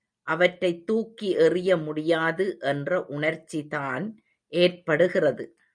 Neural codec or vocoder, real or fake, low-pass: none; real; 9.9 kHz